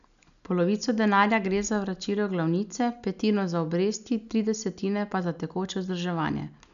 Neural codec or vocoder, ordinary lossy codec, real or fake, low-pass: none; none; real; 7.2 kHz